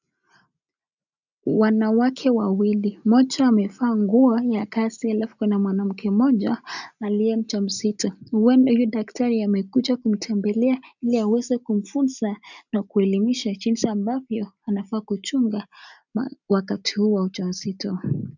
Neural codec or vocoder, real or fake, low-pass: none; real; 7.2 kHz